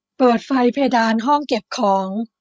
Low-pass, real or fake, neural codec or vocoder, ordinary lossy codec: none; fake; codec, 16 kHz, 16 kbps, FreqCodec, larger model; none